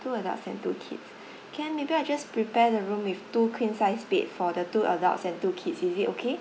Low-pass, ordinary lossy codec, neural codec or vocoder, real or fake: none; none; none; real